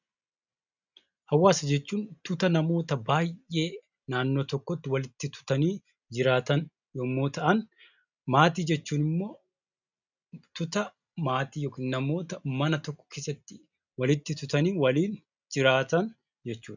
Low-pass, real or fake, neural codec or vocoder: 7.2 kHz; real; none